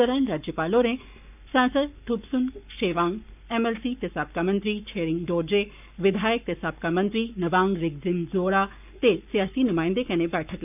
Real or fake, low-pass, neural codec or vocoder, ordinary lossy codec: fake; 3.6 kHz; codec, 44.1 kHz, 7.8 kbps, Pupu-Codec; none